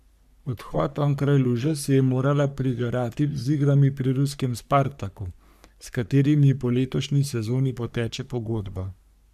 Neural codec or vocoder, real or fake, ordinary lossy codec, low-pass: codec, 44.1 kHz, 3.4 kbps, Pupu-Codec; fake; none; 14.4 kHz